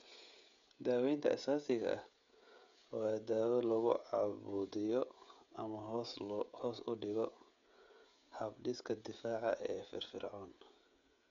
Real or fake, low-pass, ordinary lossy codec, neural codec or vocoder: fake; 7.2 kHz; MP3, 64 kbps; codec, 16 kHz, 16 kbps, FreqCodec, smaller model